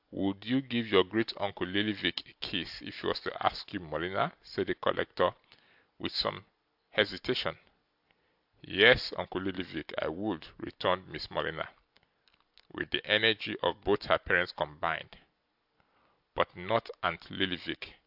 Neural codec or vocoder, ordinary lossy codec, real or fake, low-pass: none; AAC, 48 kbps; real; 5.4 kHz